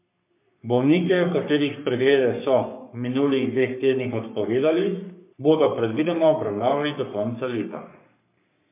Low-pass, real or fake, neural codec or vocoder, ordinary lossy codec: 3.6 kHz; fake; codec, 44.1 kHz, 3.4 kbps, Pupu-Codec; MP3, 32 kbps